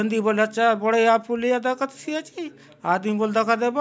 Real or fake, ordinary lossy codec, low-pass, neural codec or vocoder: real; none; none; none